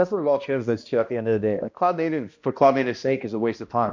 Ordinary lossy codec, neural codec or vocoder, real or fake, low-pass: MP3, 48 kbps; codec, 16 kHz, 1 kbps, X-Codec, HuBERT features, trained on balanced general audio; fake; 7.2 kHz